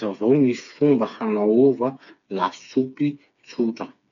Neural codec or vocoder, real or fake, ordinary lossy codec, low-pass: codec, 16 kHz, 4 kbps, FreqCodec, smaller model; fake; none; 7.2 kHz